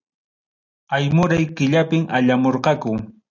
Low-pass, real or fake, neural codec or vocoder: 7.2 kHz; real; none